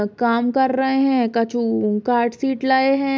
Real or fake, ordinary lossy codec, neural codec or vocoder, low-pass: real; none; none; none